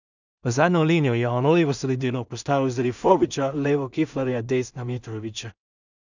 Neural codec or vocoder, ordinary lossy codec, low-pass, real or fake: codec, 16 kHz in and 24 kHz out, 0.4 kbps, LongCat-Audio-Codec, two codebook decoder; none; 7.2 kHz; fake